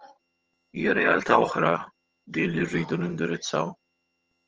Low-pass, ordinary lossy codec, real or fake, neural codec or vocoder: 7.2 kHz; Opus, 24 kbps; fake; vocoder, 22.05 kHz, 80 mel bands, HiFi-GAN